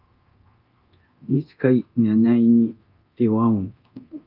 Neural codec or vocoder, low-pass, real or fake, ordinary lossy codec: codec, 24 kHz, 0.9 kbps, DualCodec; 5.4 kHz; fake; Opus, 32 kbps